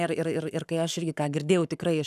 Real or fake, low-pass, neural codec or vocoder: fake; 14.4 kHz; codec, 44.1 kHz, 7.8 kbps, Pupu-Codec